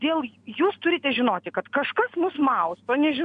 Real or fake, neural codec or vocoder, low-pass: real; none; 9.9 kHz